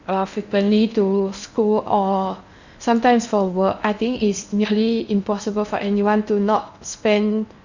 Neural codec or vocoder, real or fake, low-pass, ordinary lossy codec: codec, 16 kHz in and 24 kHz out, 0.8 kbps, FocalCodec, streaming, 65536 codes; fake; 7.2 kHz; none